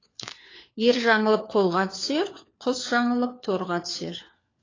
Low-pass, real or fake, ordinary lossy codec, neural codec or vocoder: 7.2 kHz; fake; AAC, 32 kbps; codec, 16 kHz, 4 kbps, FunCodec, trained on LibriTTS, 50 frames a second